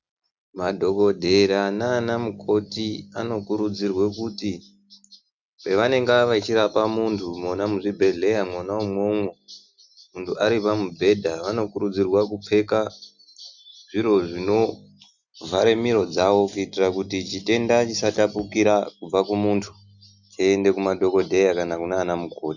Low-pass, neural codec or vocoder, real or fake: 7.2 kHz; none; real